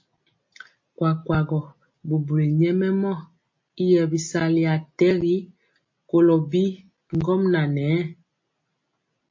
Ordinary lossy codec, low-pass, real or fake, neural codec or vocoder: MP3, 32 kbps; 7.2 kHz; real; none